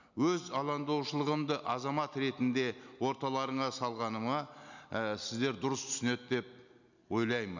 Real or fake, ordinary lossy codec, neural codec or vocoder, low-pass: real; none; none; 7.2 kHz